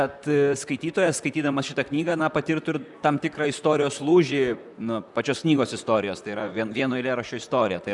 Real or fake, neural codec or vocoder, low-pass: fake; vocoder, 44.1 kHz, 128 mel bands, Pupu-Vocoder; 10.8 kHz